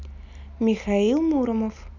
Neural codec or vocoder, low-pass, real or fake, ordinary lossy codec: none; 7.2 kHz; real; none